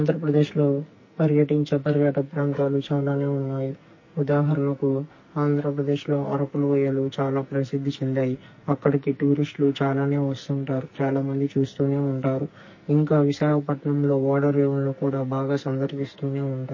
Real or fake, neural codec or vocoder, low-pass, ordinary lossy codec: fake; codec, 44.1 kHz, 2.6 kbps, SNAC; 7.2 kHz; MP3, 32 kbps